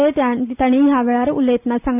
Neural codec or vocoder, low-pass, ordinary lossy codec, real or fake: none; 3.6 kHz; none; real